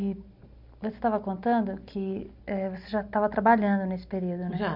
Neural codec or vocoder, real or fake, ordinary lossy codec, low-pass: none; real; none; 5.4 kHz